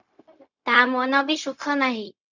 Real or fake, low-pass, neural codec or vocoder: fake; 7.2 kHz; codec, 16 kHz, 0.4 kbps, LongCat-Audio-Codec